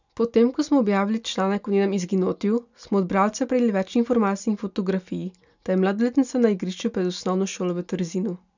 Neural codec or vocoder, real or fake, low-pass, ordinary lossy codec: none; real; 7.2 kHz; none